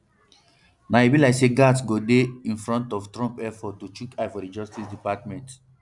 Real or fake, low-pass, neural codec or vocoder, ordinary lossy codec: real; 10.8 kHz; none; none